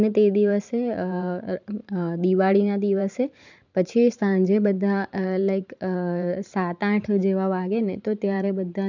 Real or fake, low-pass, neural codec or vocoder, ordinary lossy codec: fake; 7.2 kHz; vocoder, 44.1 kHz, 128 mel bands every 512 samples, BigVGAN v2; none